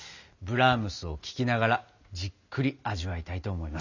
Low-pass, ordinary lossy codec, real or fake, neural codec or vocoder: 7.2 kHz; none; real; none